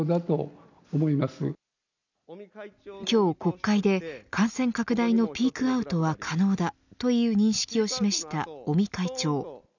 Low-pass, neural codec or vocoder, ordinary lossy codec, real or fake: 7.2 kHz; none; none; real